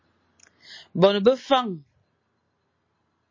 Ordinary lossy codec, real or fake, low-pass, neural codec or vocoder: MP3, 32 kbps; real; 7.2 kHz; none